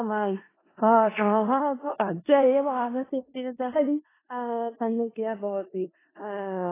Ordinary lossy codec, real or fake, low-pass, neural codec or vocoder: AAC, 16 kbps; fake; 3.6 kHz; codec, 16 kHz in and 24 kHz out, 0.4 kbps, LongCat-Audio-Codec, four codebook decoder